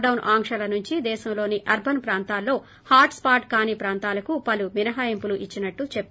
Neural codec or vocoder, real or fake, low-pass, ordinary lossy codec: none; real; none; none